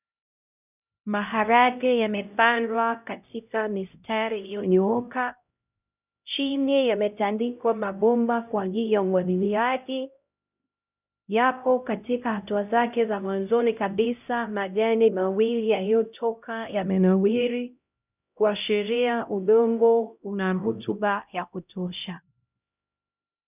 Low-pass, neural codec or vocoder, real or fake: 3.6 kHz; codec, 16 kHz, 0.5 kbps, X-Codec, HuBERT features, trained on LibriSpeech; fake